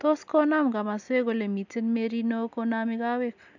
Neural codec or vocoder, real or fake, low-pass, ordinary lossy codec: none; real; 7.2 kHz; none